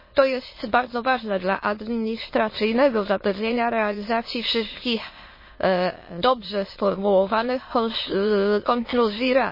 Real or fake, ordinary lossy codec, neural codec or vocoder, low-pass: fake; MP3, 24 kbps; autoencoder, 22.05 kHz, a latent of 192 numbers a frame, VITS, trained on many speakers; 5.4 kHz